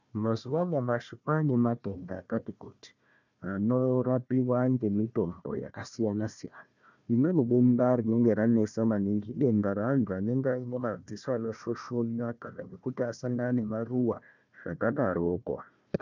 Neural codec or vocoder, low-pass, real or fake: codec, 16 kHz, 1 kbps, FunCodec, trained on Chinese and English, 50 frames a second; 7.2 kHz; fake